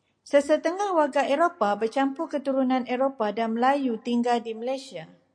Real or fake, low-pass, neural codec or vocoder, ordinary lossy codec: real; 9.9 kHz; none; MP3, 48 kbps